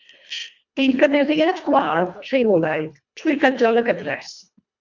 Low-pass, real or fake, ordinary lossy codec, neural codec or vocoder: 7.2 kHz; fake; MP3, 64 kbps; codec, 24 kHz, 1.5 kbps, HILCodec